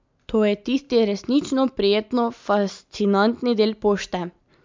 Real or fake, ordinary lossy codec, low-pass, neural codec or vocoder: real; MP3, 64 kbps; 7.2 kHz; none